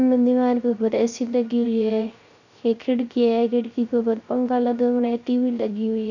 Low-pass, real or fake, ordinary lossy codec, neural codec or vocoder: 7.2 kHz; fake; none; codec, 16 kHz, 0.3 kbps, FocalCodec